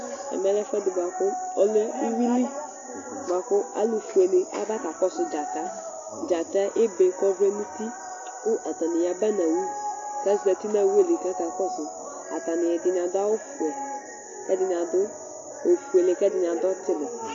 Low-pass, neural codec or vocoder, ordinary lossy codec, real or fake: 7.2 kHz; none; AAC, 48 kbps; real